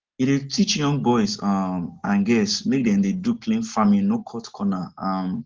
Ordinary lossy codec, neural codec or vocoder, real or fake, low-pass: Opus, 16 kbps; none; real; 7.2 kHz